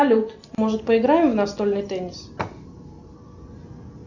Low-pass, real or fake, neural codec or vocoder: 7.2 kHz; real; none